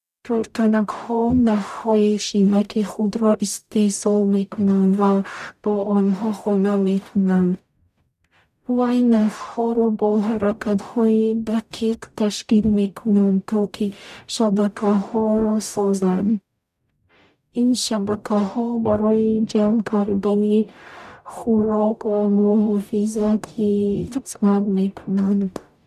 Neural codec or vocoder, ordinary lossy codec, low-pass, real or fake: codec, 44.1 kHz, 0.9 kbps, DAC; none; 14.4 kHz; fake